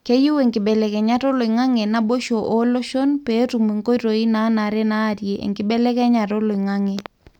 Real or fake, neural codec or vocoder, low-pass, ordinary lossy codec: real; none; 19.8 kHz; none